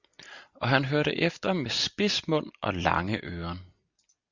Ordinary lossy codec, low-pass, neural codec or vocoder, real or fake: Opus, 64 kbps; 7.2 kHz; none; real